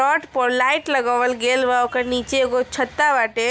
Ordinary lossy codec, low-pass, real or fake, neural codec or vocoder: none; none; real; none